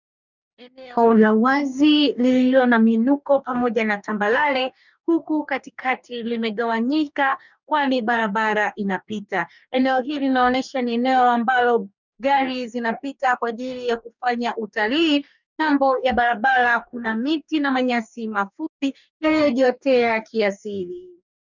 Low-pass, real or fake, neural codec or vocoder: 7.2 kHz; fake; codec, 44.1 kHz, 2.6 kbps, DAC